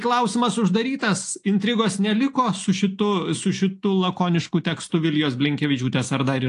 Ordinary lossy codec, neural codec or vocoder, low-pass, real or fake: AAC, 48 kbps; none; 10.8 kHz; real